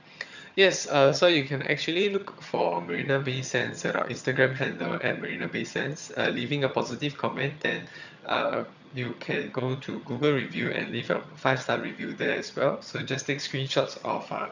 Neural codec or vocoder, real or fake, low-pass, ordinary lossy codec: vocoder, 22.05 kHz, 80 mel bands, HiFi-GAN; fake; 7.2 kHz; none